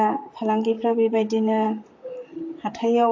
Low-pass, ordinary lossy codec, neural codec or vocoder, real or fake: 7.2 kHz; AAC, 48 kbps; vocoder, 44.1 kHz, 128 mel bands, Pupu-Vocoder; fake